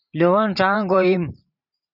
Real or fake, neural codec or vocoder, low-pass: fake; vocoder, 44.1 kHz, 128 mel bands every 512 samples, BigVGAN v2; 5.4 kHz